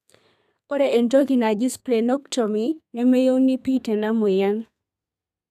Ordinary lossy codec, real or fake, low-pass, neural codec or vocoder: none; fake; 14.4 kHz; codec, 32 kHz, 1.9 kbps, SNAC